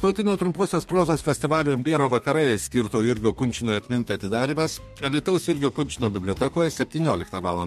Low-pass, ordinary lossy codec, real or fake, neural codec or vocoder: 14.4 kHz; MP3, 64 kbps; fake; codec, 32 kHz, 1.9 kbps, SNAC